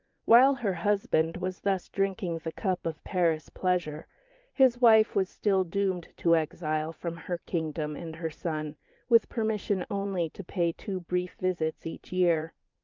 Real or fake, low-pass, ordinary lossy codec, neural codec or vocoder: fake; 7.2 kHz; Opus, 24 kbps; vocoder, 44.1 kHz, 80 mel bands, Vocos